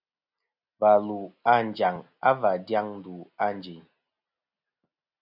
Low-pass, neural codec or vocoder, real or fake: 5.4 kHz; none; real